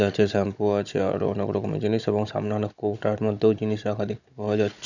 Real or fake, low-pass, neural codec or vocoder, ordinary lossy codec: fake; 7.2 kHz; codec, 16 kHz, 16 kbps, FunCodec, trained on Chinese and English, 50 frames a second; none